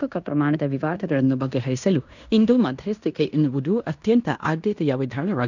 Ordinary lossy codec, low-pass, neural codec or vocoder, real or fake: none; 7.2 kHz; codec, 16 kHz in and 24 kHz out, 0.9 kbps, LongCat-Audio-Codec, fine tuned four codebook decoder; fake